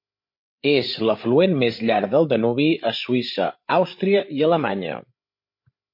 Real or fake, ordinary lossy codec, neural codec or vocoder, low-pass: fake; MP3, 32 kbps; codec, 16 kHz, 8 kbps, FreqCodec, larger model; 5.4 kHz